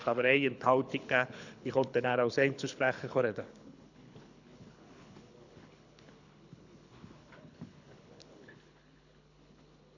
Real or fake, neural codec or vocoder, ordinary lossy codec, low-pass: fake; codec, 24 kHz, 6 kbps, HILCodec; none; 7.2 kHz